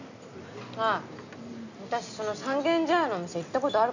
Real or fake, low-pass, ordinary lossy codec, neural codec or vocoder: real; 7.2 kHz; none; none